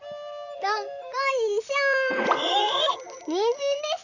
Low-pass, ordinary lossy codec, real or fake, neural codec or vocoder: 7.2 kHz; none; fake; vocoder, 44.1 kHz, 128 mel bands, Pupu-Vocoder